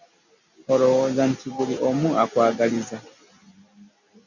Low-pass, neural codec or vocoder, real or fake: 7.2 kHz; none; real